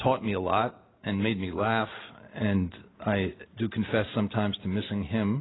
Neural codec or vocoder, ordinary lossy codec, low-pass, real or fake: none; AAC, 16 kbps; 7.2 kHz; real